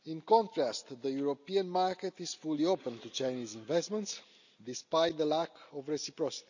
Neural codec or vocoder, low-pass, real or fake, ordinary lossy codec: none; 7.2 kHz; real; none